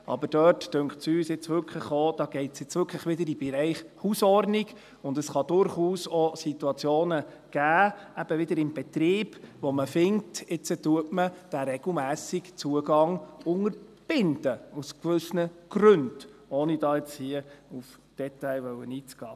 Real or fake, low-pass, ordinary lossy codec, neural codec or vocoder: real; 14.4 kHz; none; none